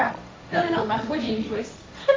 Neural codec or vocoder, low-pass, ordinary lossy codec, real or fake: codec, 16 kHz, 1.1 kbps, Voila-Tokenizer; none; none; fake